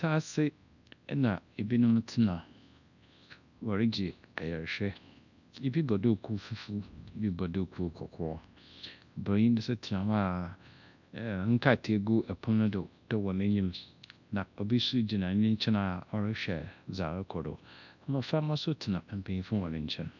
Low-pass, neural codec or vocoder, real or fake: 7.2 kHz; codec, 24 kHz, 0.9 kbps, WavTokenizer, large speech release; fake